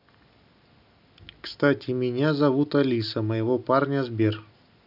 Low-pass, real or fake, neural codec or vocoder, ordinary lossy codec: 5.4 kHz; real; none; none